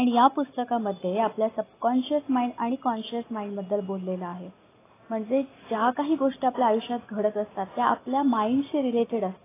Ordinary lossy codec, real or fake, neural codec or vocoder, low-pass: AAC, 16 kbps; real; none; 3.6 kHz